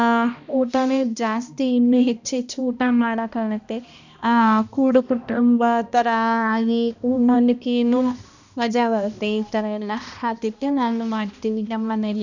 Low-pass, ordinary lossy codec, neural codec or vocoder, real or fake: 7.2 kHz; none; codec, 16 kHz, 1 kbps, X-Codec, HuBERT features, trained on balanced general audio; fake